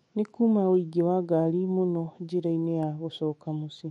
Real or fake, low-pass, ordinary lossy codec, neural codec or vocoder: fake; 19.8 kHz; MP3, 48 kbps; autoencoder, 48 kHz, 128 numbers a frame, DAC-VAE, trained on Japanese speech